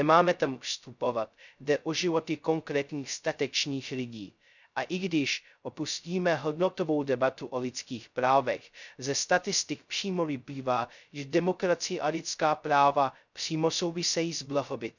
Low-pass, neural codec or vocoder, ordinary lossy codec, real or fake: 7.2 kHz; codec, 16 kHz, 0.2 kbps, FocalCodec; none; fake